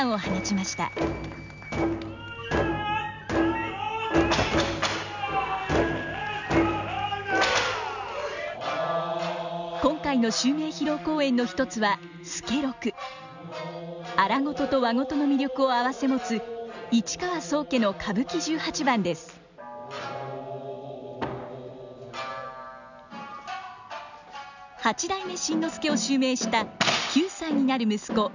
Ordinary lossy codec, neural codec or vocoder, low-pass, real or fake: none; none; 7.2 kHz; real